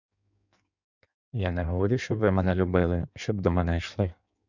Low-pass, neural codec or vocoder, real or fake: 7.2 kHz; codec, 16 kHz in and 24 kHz out, 1.1 kbps, FireRedTTS-2 codec; fake